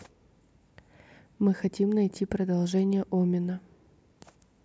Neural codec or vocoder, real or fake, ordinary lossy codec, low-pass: none; real; none; none